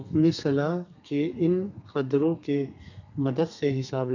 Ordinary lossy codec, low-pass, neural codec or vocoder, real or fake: none; 7.2 kHz; codec, 32 kHz, 1.9 kbps, SNAC; fake